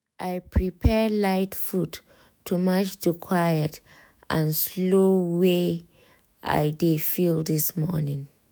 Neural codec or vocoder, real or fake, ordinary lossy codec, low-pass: autoencoder, 48 kHz, 128 numbers a frame, DAC-VAE, trained on Japanese speech; fake; none; none